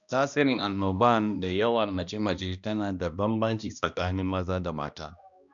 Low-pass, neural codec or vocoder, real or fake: 7.2 kHz; codec, 16 kHz, 1 kbps, X-Codec, HuBERT features, trained on balanced general audio; fake